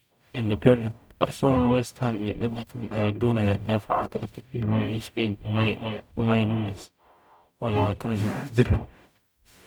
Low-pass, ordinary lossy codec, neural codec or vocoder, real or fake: none; none; codec, 44.1 kHz, 0.9 kbps, DAC; fake